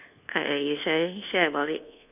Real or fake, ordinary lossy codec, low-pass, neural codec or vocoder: fake; none; 3.6 kHz; codec, 24 kHz, 1.2 kbps, DualCodec